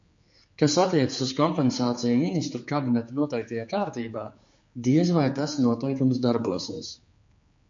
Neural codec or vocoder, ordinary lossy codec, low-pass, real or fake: codec, 16 kHz, 4 kbps, X-Codec, HuBERT features, trained on general audio; MP3, 48 kbps; 7.2 kHz; fake